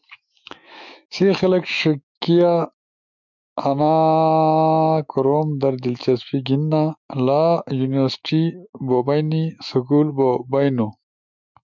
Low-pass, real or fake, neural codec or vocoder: 7.2 kHz; fake; autoencoder, 48 kHz, 128 numbers a frame, DAC-VAE, trained on Japanese speech